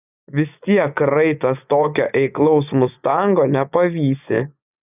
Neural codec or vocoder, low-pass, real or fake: vocoder, 24 kHz, 100 mel bands, Vocos; 3.6 kHz; fake